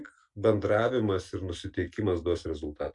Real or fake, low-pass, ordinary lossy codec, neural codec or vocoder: real; 10.8 kHz; MP3, 64 kbps; none